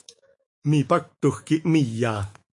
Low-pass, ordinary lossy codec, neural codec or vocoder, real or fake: 10.8 kHz; MP3, 48 kbps; autoencoder, 48 kHz, 128 numbers a frame, DAC-VAE, trained on Japanese speech; fake